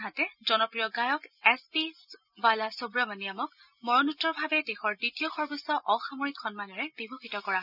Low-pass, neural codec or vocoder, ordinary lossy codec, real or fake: 5.4 kHz; none; none; real